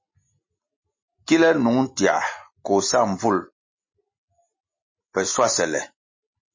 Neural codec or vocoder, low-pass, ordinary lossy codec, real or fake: none; 7.2 kHz; MP3, 32 kbps; real